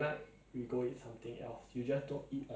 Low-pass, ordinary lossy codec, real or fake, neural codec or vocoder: none; none; real; none